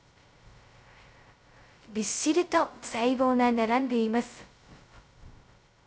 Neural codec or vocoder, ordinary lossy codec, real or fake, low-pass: codec, 16 kHz, 0.2 kbps, FocalCodec; none; fake; none